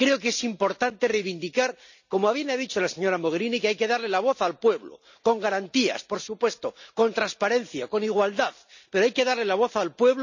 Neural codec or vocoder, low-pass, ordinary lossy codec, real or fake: none; 7.2 kHz; none; real